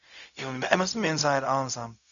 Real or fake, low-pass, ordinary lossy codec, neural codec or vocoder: fake; 7.2 kHz; MP3, 96 kbps; codec, 16 kHz, 0.4 kbps, LongCat-Audio-Codec